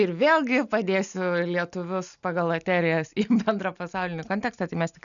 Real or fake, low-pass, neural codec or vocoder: real; 7.2 kHz; none